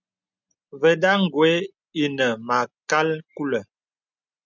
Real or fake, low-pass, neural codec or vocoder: real; 7.2 kHz; none